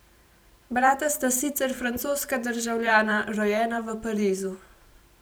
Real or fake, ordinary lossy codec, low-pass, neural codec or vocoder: fake; none; none; vocoder, 44.1 kHz, 128 mel bands every 512 samples, BigVGAN v2